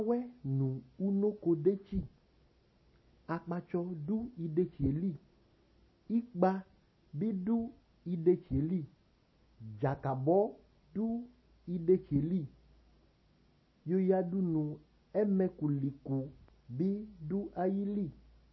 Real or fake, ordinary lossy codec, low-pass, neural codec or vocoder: real; MP3, 24 kbps; 7.2 kHz; none